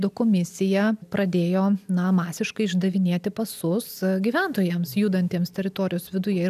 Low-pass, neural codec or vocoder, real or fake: 14.4 kHz; none; real